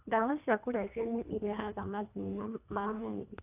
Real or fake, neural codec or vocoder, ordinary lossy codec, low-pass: fake; codec, 24 kHz, 1.5 kbps, HILCodec; AAC, 24 kbps; 3.6 kHz